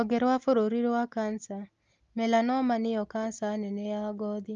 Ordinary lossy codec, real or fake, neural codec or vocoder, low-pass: Opus, 32 kbps; real; none; 7.2 kHz